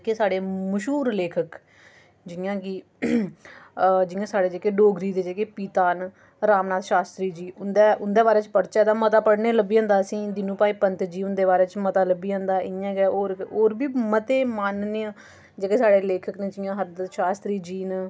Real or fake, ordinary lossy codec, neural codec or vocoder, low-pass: real; none; none; none